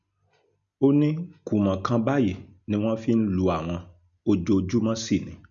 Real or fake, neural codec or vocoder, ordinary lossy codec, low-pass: real; none; none; 7.2 kHz